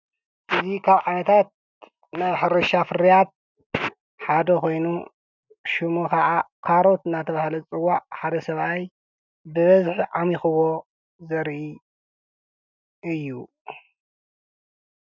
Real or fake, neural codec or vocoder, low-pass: real; none; 7.2 kHz